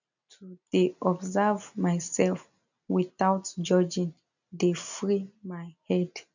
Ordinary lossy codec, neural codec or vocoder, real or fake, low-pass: none; none; real; 7.2 kHz